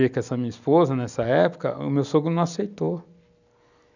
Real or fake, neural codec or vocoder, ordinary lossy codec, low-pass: real; none; none; 7.2 kHz